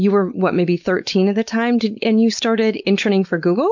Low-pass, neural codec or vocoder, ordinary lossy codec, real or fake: 7.2 kHz; codec, 16 kHz, 4.8 kbps, FACodec; MP3, 64 kbps; fake